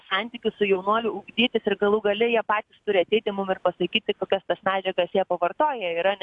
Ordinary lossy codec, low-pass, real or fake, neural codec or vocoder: AAC, 64 kbps; 10.8 kHz; real; none